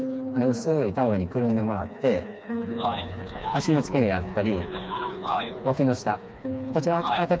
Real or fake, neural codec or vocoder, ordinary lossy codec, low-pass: fake; codec, 16 kHz, 2 kbps, FreqCodec, smaller model; none; none